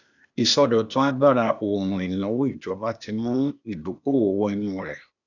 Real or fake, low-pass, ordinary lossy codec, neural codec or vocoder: fake; 7.2 kHz; none; codec, 16 kHz, 0.8 kbps, ZipCodec